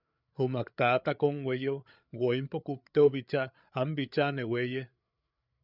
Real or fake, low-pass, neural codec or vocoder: fake; 5.4 kHz; codec, 16 kHz, 8 kbps, FreqCodec, larger model